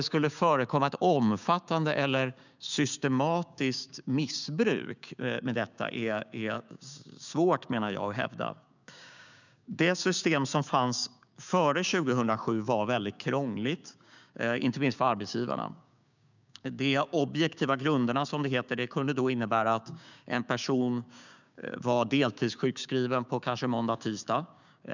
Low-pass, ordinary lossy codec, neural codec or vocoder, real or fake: 7.2 kHz; none; codec, 16 kHz, 6 kbps, DAC; fake